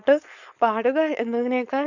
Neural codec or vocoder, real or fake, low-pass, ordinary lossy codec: codec, 16 kHz, 4.8 kbps, FACodec; fake; 7.2 kHz; none